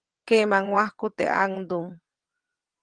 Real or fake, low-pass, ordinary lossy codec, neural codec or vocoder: fake; 9.9 kHz; Opus, 24 kbps; vocoder, 22.05 kHz, 80 mel bands, WaveNeXt